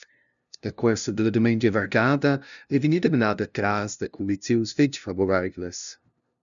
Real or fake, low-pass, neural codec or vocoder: fake; 7.2 kHz; codec, 16 kHz, 0.5 kbps, FunCodec, trained on LibriTTS, 25 frames a second